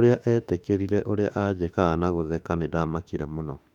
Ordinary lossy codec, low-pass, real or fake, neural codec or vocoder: none; 19.8 kHz; fake; autoencoder, 48 kHz, 32 numbers a frame, DAC-VAE, trained on Japanese speech